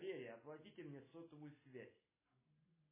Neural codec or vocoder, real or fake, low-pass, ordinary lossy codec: none; real; 3.6 kHz; MP3, 16 kbps